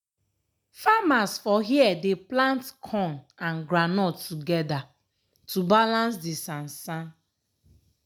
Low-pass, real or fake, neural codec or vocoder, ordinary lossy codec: none; real; none; none